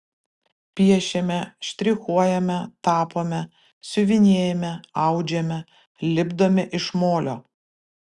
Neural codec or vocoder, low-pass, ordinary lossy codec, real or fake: none; 10.8 kHz; Opus, 64 kbps; real